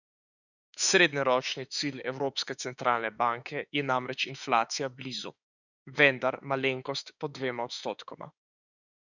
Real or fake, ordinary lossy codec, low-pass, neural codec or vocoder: fake; none; 7.2 kHz; autoencoder, 48 kHz, 32 numbers a frame, DAC-VAE, trained on Japanese speech